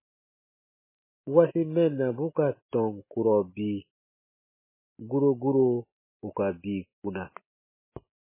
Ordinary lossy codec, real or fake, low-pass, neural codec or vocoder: MP3, 16 kbps; real; 3.6 kHz; none